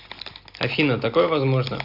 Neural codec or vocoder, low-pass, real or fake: none; 5.4 kHz; real